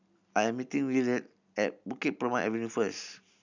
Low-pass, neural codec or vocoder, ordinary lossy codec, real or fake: 7.2 kHz; none; none; real